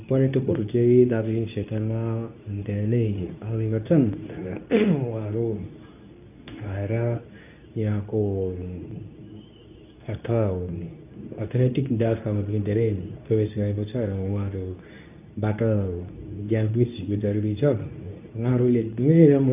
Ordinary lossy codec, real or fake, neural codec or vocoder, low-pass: none; fake; codec, 24 kHz, 0.9 kbps, WavTokenizer, medium speech release version 2; 3.6 kHz